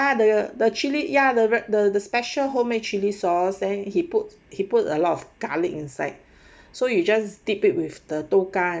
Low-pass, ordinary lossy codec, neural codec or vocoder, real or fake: none; none; none; real